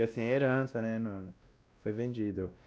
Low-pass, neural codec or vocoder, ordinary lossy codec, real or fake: none; codec, 16 kHz, 1 kbps, X-Codec, WavLM features, trained on Multilingual LibriSpeech; none; fake